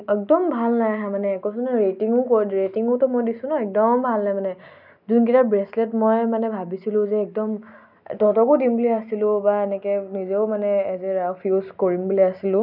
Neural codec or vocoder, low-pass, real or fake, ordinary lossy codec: none; 5.4 kHz; real; none